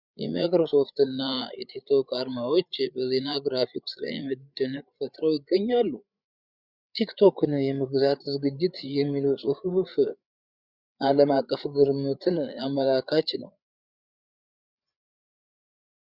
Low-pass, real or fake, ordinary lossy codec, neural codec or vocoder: 5.4 kHz; fake; Opus, 64 kbps; codec, 16 kHz, 8 kbps, FreqCodec, larger model